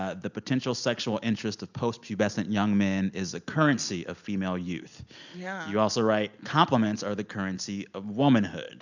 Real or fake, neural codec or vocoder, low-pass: real; none; 7.2 kHz